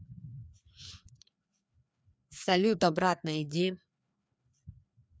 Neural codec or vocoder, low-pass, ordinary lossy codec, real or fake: codec, 16 kHz, 2 kbps, FreqCodec, larger model; none; none; fake